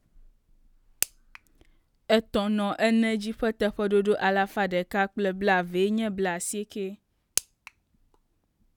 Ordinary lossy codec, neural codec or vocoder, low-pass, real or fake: none; none; 19.8 kHz; real